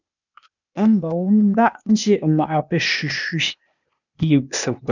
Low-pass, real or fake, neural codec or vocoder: 7.2 kHz; fake; codec, 16 kHz, 0.8 kbps, ZipCodec